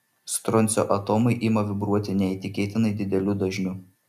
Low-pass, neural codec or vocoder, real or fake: 14.4 kHz; none; real